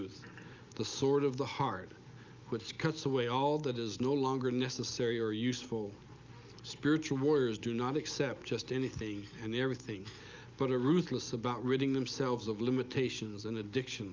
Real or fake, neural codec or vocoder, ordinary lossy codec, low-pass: real; none; Opus, 24 kbps; 7.2 kHz